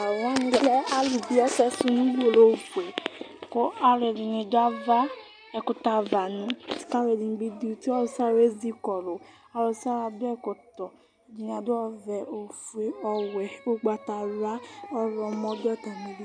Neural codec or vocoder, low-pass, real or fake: none; 9.9 kHz; real